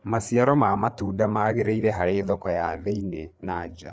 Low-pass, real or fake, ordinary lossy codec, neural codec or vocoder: none; fake; none; codec, 16 kHz, 4 kbps, FreqCodec, larger model